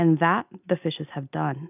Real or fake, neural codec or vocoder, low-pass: real; none; 3.6 kHz